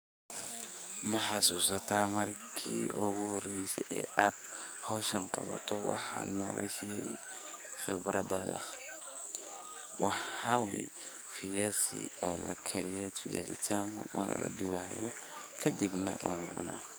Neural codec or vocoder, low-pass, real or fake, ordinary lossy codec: codec, 44.1 kHz, 2.6 kbps, SNAC; none; fake; none